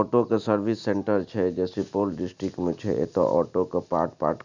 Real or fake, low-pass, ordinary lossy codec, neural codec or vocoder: real; 7.2 kHz; none; none